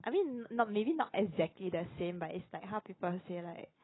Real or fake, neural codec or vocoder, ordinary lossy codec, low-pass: real; none; AAC, 16 kbps; 7.2 kHz